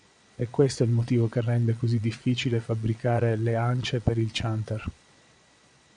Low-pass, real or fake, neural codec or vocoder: 9.9 kHz; fake; vocoder, 22.05 kHz, 80 mel bands, Vocos